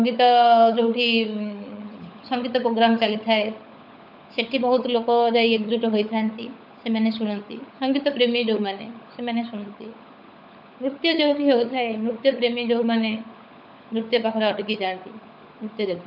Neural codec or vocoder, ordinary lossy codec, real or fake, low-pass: codec, 16 kHz, 16 kbps, FunCodec, trained on LibriTTS, 50 frames a second; none; fake; 5.4 kHz